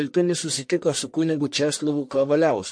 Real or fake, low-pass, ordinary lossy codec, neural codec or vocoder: fake; 9.9 kHz; MP3, 48 kbps; codec, 44.1 kHz, 1.7 kbps, Pupu-Codec